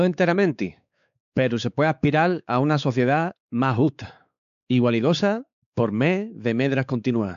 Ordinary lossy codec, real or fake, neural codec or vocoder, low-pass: none; fake; codec, 16 kHz, 4 kbps, X-Codec, WavLM features, trained on Multilingual LibriSpeech; 7.2 kHz